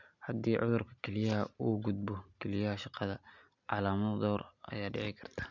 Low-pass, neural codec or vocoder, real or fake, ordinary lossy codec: 7.2 kHz; none; real; MP3, 64 kbps